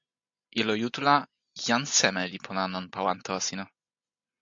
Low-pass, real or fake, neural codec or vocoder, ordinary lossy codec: 7.2 kHz; real; none; AAC, 64 kbps